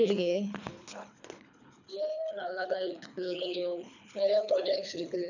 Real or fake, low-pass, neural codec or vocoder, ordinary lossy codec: fake; 7.2 kHz; codec, 24 kHz, 3 kbps, HILCodec; none